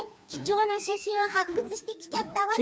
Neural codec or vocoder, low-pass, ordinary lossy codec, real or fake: codec, 16 kHz, 2 kbps, FreqCodec, larger model; none; none; fake